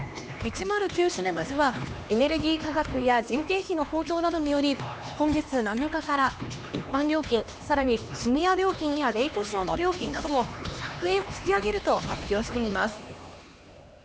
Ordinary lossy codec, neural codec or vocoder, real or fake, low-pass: none; codec, 16 kHz, 2 kbps, X-Codec, HuBERT features, trained on LibriSpeech; fake; none